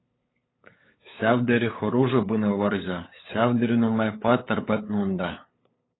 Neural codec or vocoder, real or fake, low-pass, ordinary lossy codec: codec, 16 kHz, 8 kbps, FunCodec, trained on LibriTTS, 25 frames a second; fake; 7.2 kHz; AAC, 16 kbps